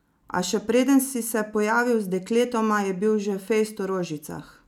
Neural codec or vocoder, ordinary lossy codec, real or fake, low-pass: none; none; real; 19.8 kHz